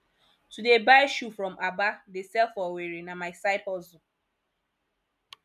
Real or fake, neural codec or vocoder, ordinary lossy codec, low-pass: real; none; none; 14.4 kHz